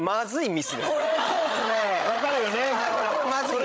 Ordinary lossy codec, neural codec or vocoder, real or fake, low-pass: none; codec, 16 kHz, 16 kbps, FreqCodec, larger model; fake; none